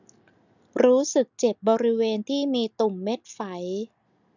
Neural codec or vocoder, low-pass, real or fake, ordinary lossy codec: none; 7.2 kHz; real; none